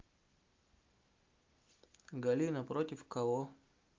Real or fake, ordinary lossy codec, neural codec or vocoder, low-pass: real; Opus, 32 kbps; none; 7.2 kHz